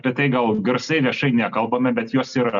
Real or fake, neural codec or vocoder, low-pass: real; none; 7.2 kHz